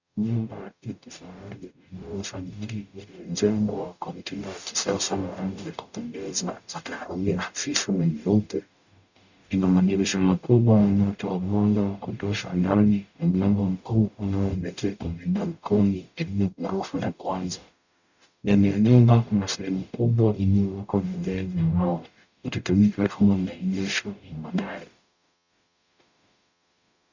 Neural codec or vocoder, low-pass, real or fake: codec, 44.1 kHz, 0.9 kbps, DAC; 7.2 kHz; fake